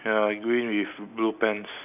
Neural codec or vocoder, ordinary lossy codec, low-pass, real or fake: none; none; 3.6 kHz; real